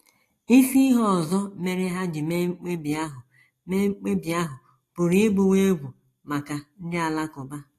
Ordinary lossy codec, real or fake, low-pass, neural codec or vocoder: AAC, 48 kbps; real; 14.4 kHz; none